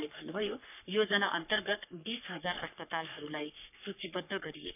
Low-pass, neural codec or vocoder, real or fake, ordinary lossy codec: 3.6 kHz; codec, 44.1 kHz, 3.4 kbps, Pupu-Codec; fake; none